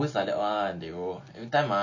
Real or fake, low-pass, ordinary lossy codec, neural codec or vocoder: real; 7.2 kHz; none; none